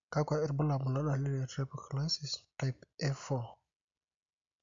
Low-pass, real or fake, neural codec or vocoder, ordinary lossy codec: 7.2 kHz; real; none; MP3, 64 kbps